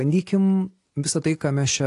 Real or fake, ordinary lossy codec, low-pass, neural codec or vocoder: real; AAC, 48 kbps; 10.8 kHz; none